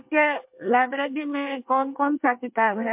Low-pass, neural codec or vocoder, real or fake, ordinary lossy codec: 3.6 kHz; codec, 24 kHz, 1 kbps, SNAC; fake; MP3, 32 kbps